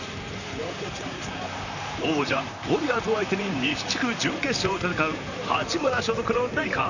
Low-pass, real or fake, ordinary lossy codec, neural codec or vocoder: 7.2 kHz; fake; none; vocoder, 44.1 kHz, 128 mel bands, Pupu-Vocoder